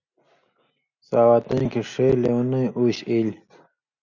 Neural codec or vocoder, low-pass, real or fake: none; 7.2 kHz; real